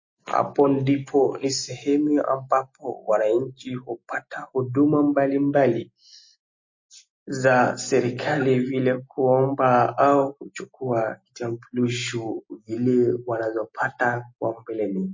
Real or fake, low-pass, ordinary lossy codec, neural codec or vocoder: real; 7.2 kHz; MP3, 32 kbps; none